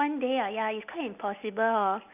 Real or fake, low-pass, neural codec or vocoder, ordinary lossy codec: real; 3.6 kHz; none; none